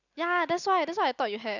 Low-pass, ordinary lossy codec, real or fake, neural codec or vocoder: 7.2 kHz; none; real; none